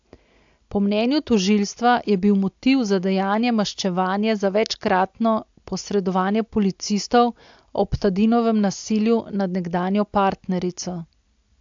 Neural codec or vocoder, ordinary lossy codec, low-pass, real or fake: none; AAC, 64 kbps; 7.2 kHz; real